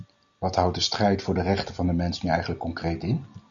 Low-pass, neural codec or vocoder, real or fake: 7.2 kHz; none; real